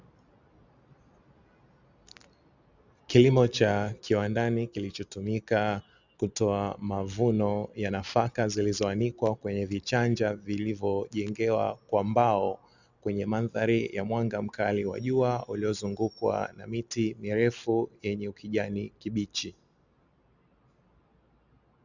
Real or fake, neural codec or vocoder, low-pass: real; none; 7.2 kHz